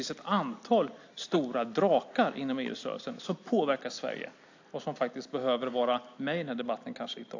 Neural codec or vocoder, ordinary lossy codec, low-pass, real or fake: none; AAC, 48 kbps; 7.2 kHz; real